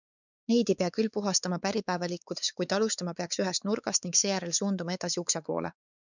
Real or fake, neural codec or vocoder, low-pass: fake; codec, 16 kHz, 4 kbps, X-Codec, WavLM features, trained on Multilingual LibriSpeech; 7.2 kHz